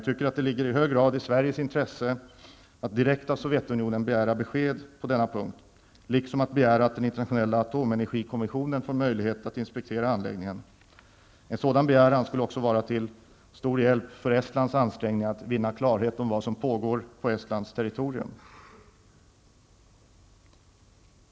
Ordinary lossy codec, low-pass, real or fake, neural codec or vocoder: none; none; real; none